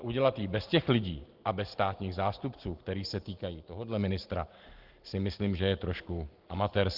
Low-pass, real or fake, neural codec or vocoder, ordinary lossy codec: 5.4 kHz; real; none; Opus, 16 kbps